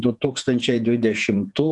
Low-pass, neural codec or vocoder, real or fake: 10.8 kHz; vocoder, 48 kHz, 128 mel bands, Vocos; fake